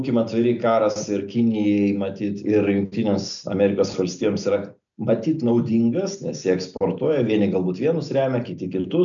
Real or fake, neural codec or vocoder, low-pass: real; none; 7.2 kHz